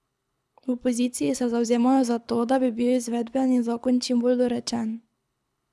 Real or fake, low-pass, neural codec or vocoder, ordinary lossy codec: fake; none; codec, 24 kHz, 6 kbps, HILCodec; none